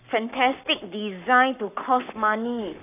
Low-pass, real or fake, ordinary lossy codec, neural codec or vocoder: 3.6 kHz; fake; none; codec, 44.1 kHz, 7.8 kbps, Pupu-Codec